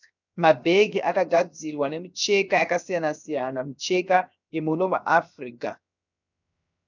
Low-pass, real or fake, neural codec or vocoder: 7.2 kHz; fake; codec, 16 kHz, 0.7 kbps, FocalCodec